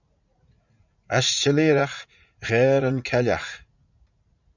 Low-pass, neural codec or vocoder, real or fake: 7.2 kHz; vocoder, 44.1 kHz, 80 mel bands, Vocos; fake